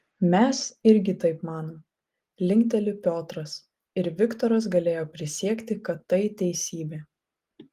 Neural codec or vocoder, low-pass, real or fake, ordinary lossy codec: none; 14.4 kHz; real; Opus, 24 kbps